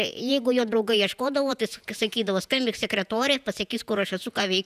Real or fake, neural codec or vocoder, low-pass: fake; vocoder, 48 kHz, 128 mel bands, Vocos; 14.4 kHz